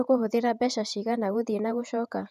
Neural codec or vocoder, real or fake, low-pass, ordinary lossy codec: vocoder, 48 kHz, 128 mel bands, Vocos; fake; 14.4 kHz; none